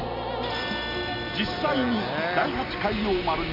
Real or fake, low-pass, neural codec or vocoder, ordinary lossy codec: real; 5.4 kHz; none; none